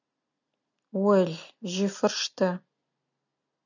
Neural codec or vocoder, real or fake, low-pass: none; real; 7.2 kHz